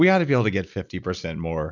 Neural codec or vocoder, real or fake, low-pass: none; real; 7.2 kHz